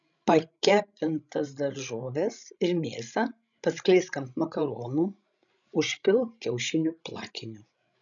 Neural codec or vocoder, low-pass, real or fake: codec, 16 kHz, 16 kbps, FreqCodec, larger model; 7.2 kHz; fake